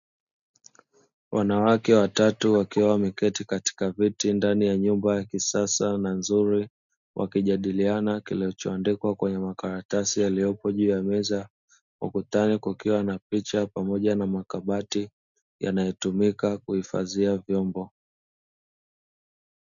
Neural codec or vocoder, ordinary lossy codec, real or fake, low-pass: none; MP3, 96 kbps; real; 7.2 kHz